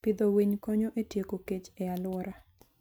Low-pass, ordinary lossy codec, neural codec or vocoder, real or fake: none; none; none; real